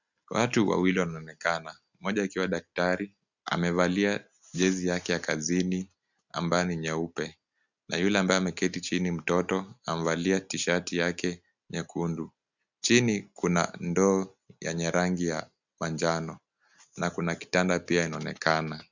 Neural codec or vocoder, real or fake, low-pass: none; real; 7.2 kHz